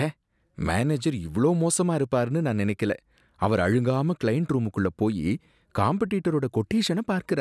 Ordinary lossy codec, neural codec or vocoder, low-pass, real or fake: none; none; none; real